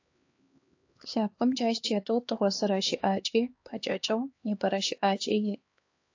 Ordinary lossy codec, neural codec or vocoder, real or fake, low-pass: AAC, 48 kbps; codec, 16 kHz, 2 kbps, X-Codec, HuBERT features, trained on LibriSpeech; fake; 7.2 kHz